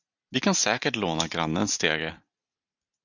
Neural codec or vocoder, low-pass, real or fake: none; 7.2 kHz; real